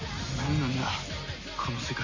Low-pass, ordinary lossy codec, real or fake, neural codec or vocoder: 7.2 kHz; MP3, 32 kbps; real; none